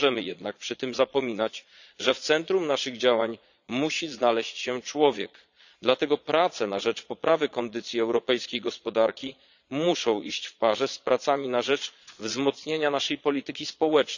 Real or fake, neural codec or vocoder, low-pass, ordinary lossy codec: fake; vocoder, 22.05 kHz, 80 mel bands, Vocos; 7.2 kHz; none